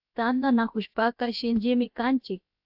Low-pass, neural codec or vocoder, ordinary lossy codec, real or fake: 5.4 kHz; codec, 16 kHz, about 1 kbps, DyCAST, with the encoder's durations; AAC, 48 kbps; fake